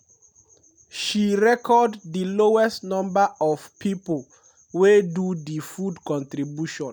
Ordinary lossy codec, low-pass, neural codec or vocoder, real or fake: none; none; none; real